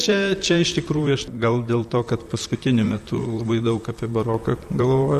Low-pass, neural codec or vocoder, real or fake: 14.4 kHz; vocoder, 44.1 kHz, 128 mel bands, Pupu-Vocoder; fake